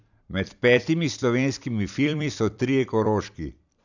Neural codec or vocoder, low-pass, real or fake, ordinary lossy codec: vocoder, 44.1 kHz, 128 mel bands every 256 samples, BigVGAN v2; 7.2 kHz; fake; none